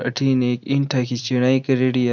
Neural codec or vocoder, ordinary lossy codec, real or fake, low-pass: none; none; real; 7.2 kHz